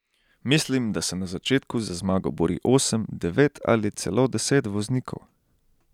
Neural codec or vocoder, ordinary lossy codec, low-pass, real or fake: none; none; 19.8 kHz; real